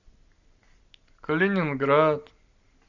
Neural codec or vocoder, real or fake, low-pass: none; real; 7.2 kHz